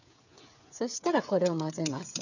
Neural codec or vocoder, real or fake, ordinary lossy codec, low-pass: codec, 16 kHz, 8 kbps, FreqCodec, smaller model; fake; none; 7.2 kHz